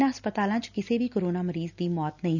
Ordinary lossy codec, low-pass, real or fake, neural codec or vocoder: none; 7.2 kHz; real; none